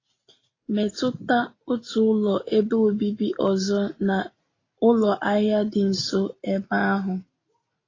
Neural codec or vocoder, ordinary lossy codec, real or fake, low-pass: none; AAC, 32 kbps; real; 7.2 kHz